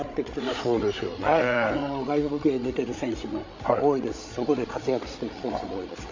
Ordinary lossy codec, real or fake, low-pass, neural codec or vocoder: MP3, 48 kbps; fake; 7.2 kHz; codec, 16 kHz, 16 kbps, FreqCodec, larger model